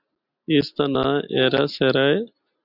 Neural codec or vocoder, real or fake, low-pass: none; real; 5.4 kHz